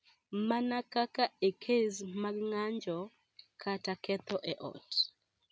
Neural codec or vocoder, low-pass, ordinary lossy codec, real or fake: none; none; none; real